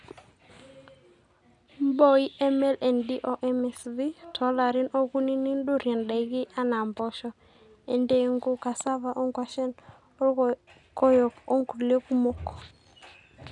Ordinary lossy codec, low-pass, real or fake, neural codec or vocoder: none; 10.8 kHz; real; none